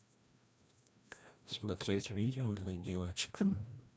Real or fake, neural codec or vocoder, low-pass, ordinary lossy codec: fake; codec, 16 kHz, 1 kbps, FreqCodec, larger model; none; none